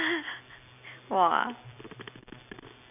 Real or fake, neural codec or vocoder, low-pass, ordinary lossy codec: real; none; 3.6 kHz; none